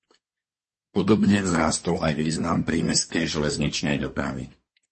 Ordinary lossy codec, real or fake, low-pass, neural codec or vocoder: MP3, 32 kbps; fake; 10.8 kHz; codec, 24 kHz, 1 kbps, SNAC